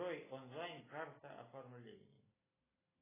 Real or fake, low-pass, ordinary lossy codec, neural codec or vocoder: real; 3.6 kHz; AAC, 16 kbps; none